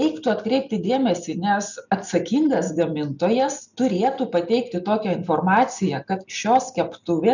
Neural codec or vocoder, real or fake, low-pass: none; real; 7.2 kHz